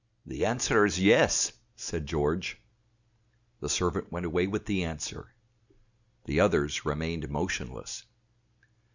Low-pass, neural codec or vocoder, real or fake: 7.2 kHz; none; real